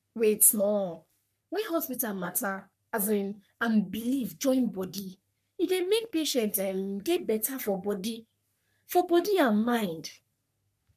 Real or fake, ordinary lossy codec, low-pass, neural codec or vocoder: fake; none; 14.4 kHz; codec, 44.1 kHz, 3.4 kbps, Pupu-Codec